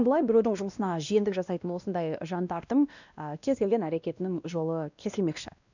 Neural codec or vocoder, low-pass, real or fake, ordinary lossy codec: codec, 16 kHz, 1 kbps, X-Codec, WavLM features, trained on Multilingual LibriSpeech; 7.2 kHz; fake; none